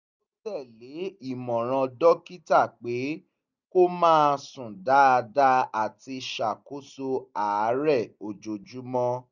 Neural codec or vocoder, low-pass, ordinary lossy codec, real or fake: none; 7.2 kHz; none; real